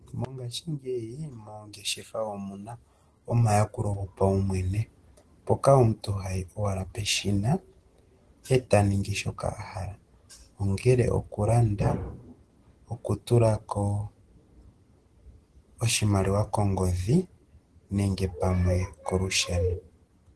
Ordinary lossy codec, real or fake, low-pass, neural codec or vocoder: Opus, 16 kbps; real; 10.8 kHz; none